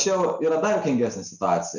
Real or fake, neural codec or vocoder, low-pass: real; none; 7.2 kHz